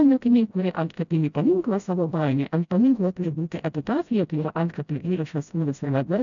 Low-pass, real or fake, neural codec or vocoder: 7.2 kHz; fake; codec, 16 kHz, 0.5 kbps, FreqCodec, smaller model